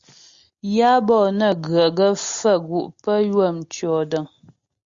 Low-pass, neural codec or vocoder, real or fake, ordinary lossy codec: 7.2 kHz; none; real; Opus, 64 kbps